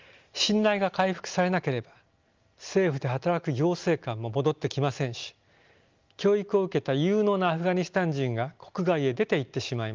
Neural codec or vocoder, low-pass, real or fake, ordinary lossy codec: none; 7.2 kHz; real; Opus, 32 kbps